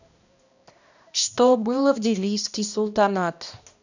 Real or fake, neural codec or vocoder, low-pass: fake; codec, 16 kHz, 1 kbps, X-Codec, HuBERT features, trained on balanced general audio; 7.2 kHz